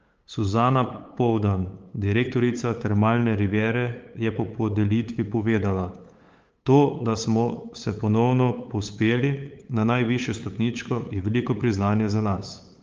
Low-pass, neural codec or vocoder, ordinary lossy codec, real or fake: 7.2 kHz; codec, 16 kHz, 8 kbps, FunCodec, trained on LibriTTS, 25 frames a second; Opus, 24 kbps; fake